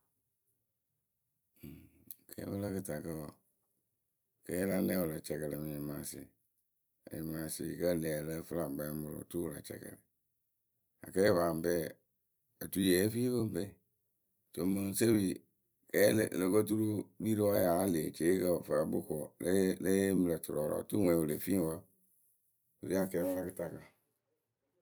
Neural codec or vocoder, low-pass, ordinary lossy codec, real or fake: vocoder, 44.1 kHz, 128 mel bands every 256 samples, BigVGAN v2; none; none; fake